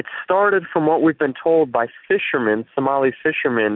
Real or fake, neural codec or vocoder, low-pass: real; none; 5.4 kHz